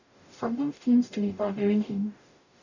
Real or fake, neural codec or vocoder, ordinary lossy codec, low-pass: fake; codec, 44.1 kHz, 0.9 kbps, DAC; none; 7.2 kHz